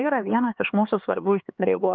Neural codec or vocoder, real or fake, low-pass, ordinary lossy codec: codec, 16 kHz, 4 kbps, X-Codec, HuBERT features, trained on LibriSpeech; fake; 7.2 kHz; Opus, 24 kbps